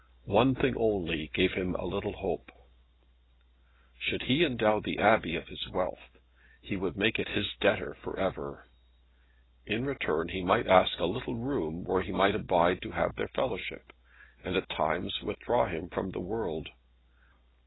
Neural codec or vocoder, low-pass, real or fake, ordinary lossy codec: none; 7.2 kHz; real; AAC, 16 kbps